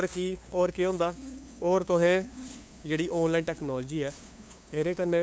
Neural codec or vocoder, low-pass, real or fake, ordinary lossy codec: codec, 16 kHz, 2 kbps, FunCodec, trained on LibriTTS, 25 frames a second; none; fake; none